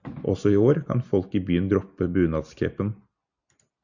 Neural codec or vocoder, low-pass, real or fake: none; 7.2 kHz; real